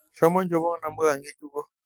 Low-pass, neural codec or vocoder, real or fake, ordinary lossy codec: none; codec, 44.1 kHz, 7.8 kbps, DAC; fake; none